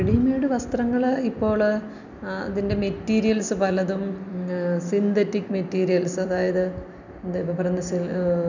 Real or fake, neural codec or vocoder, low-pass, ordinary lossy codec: real; none; 7.2 kHz; none